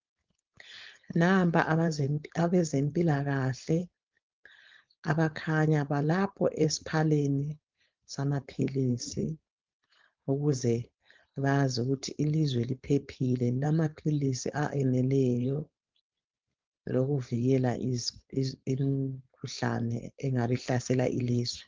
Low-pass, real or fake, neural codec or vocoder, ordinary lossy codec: 7.2 kHz; fake; codec, 16 kHz, 4.8 kbps, FACodec; Opus, 32 kbps